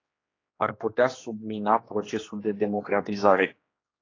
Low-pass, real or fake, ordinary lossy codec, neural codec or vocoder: 7.2 kHz; fake; AAC, 32 kbps; codec, 16 kHz, 2 kbps, X-Codec, HuBERT features, trained on general audio